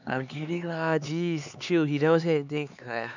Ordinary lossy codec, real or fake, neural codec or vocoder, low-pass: none; fake; codec, 16 kHz, 4 kbps, X-Codec, HuBERT features, trained on LibriSpeech; 7.2 kHz